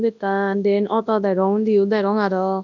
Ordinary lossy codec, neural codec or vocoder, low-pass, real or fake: none; codec, 24 kHz, 0.9 kbps, WavTokenizer, large speech release; 7.2 kHz; fake